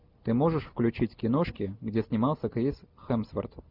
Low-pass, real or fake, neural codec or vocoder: 5.4 kHz; real; none